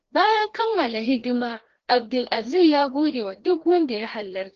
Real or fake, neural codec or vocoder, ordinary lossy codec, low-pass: fake; codec, 16 kHz, 1 kbps, FreqCodec, larger model; Opus, 16 kbps; 7.2 kHz